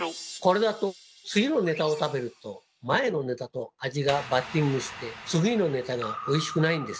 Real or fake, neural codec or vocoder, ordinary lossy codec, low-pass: real; none; none; none